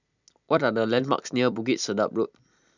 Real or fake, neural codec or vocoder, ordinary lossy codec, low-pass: fake; vocoder, 44.1 kHz, 128 mel bands every 256 samples, BigVGAN v2; none; 7.2 kHz